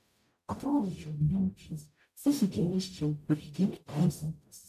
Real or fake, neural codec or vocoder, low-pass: fake; codec, 44.1 kHz, 0.9 kbps, DAC; 14.4 kHz